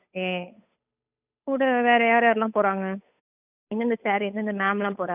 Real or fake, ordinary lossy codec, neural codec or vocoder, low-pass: fake; none; codec, 16 kHz, 8 kbps, FunCodec, trained on Chinese and English, 25 frames a second; 3.6 kHz